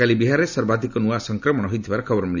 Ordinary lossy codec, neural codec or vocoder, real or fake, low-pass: none; none; real; 7.2 kHz